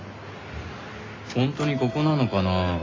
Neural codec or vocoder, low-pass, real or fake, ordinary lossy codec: none; 7.2 kHz; real; AAC, 32 kbps